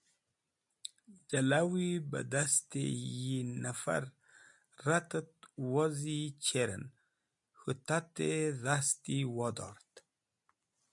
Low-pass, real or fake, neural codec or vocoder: 10.8 kHz; real; none